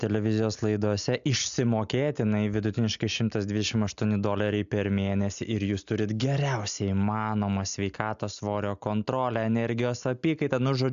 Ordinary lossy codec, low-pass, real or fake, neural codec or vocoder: AAC, 96 kbps; 7.2 kHz; real; none